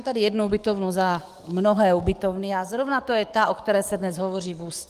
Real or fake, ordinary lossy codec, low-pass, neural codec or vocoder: fake; Opus, 24 kbps; 14.4 kHz; codec, 44.1 kHz, 7.8 kbps, DAC